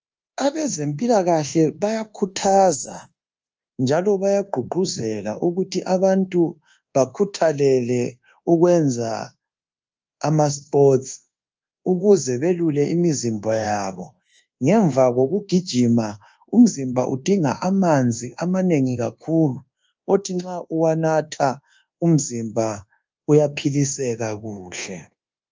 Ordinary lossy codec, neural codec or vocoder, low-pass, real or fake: Opus, 24 kbps; codec, 24 kHz, 1.2 kbps, DualCodec; 7.2 kHz; fake